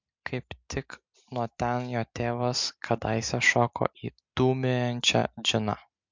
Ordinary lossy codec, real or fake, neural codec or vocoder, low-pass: MP3, 64 kbps; real; none; 7.2 kHz